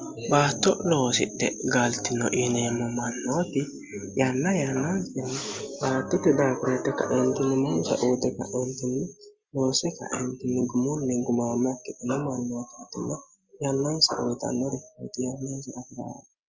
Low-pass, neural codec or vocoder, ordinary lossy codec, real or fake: 7.2 kHz; none; Opus, 32 kbps; real